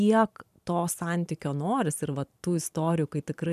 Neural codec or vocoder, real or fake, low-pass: vocoder, 44.1 kHz, 128 mel bands every 512 samples, BigVGAN v2; fake; 14.4 kHz